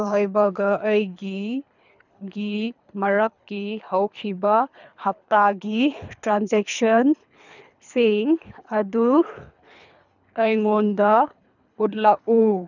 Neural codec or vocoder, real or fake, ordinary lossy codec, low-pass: codec, 24 kHz, 3 kbps, HILCodec; fake; none; 7.2 kHz